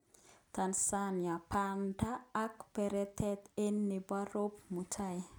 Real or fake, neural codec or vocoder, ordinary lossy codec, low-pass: real; none; none; none